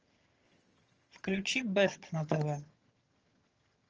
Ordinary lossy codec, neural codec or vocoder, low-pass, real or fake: Opus, 24 kbps; vocoder, 22.05 kHz, 80 mel bands, HiFi-GAN; 7.2 kHz; fake